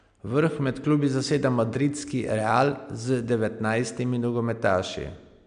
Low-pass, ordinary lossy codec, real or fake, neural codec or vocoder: 9.9 kHz; MP3, 96 kbps; real; none